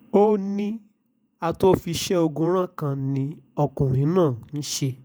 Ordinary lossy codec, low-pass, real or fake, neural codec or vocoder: none; none; fake; vocoder, 48 kHz, 128 mel bands, Vocos